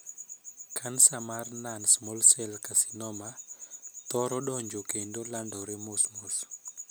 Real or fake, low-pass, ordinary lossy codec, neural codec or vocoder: real; none; none; none